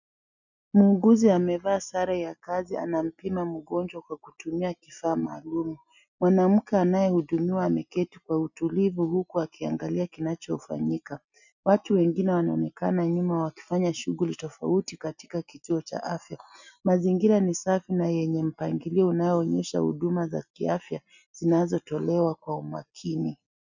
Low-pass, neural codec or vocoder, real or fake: 7.2 kHz; none; real